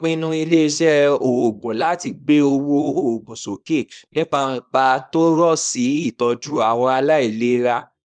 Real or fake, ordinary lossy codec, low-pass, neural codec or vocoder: fake; none; 9.9 kHz; codec, 24 kHz, 0.9 kbps, WavTokenizer, small release